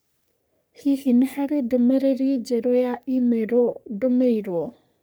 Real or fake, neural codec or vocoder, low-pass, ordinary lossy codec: fake; codec, 44.1 kHz, 3.4 kbps, Pupu-Codec; none; none